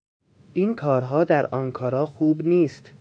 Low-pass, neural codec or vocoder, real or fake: 9.9 kHz; autoencoder, 48 kHz, 32 numbers a frame, DAC-VAE, trained on Japanese speech; fake